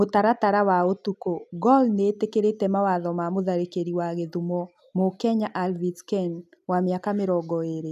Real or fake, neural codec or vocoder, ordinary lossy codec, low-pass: real; none; none; 14.4 kHz